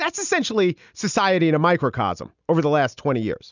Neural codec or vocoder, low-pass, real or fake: none; 7.2 kHz; real